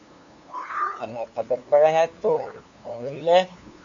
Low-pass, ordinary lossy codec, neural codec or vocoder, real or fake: 7.2 kHz; MP3, 64 kbps; codec, 16 kHz, 2 kbps, FunCodec, trained on LibriTTS, 25 frames a second; fake